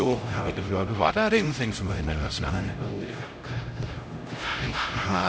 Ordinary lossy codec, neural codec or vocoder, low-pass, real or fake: none; codec, 16 kHz, 0.5 kbps, X-Codec, HuBERT features, trained on LibriSpeech; none; fake